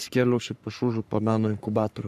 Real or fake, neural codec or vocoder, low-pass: fake; codec, 44.1 kHz, 3.4 kbps, Pupu-Codec; 14.4 kHz